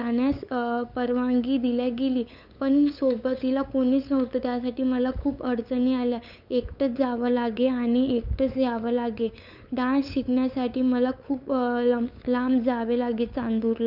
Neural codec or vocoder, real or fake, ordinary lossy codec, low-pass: codec, 16 kHz, 8 kbps, FunCodec, trained on LibriTTS, 25 frames a second; fake; none; 5.4 kHz